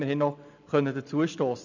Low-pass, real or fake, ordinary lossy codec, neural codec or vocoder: 7.2 kHz; real; none; none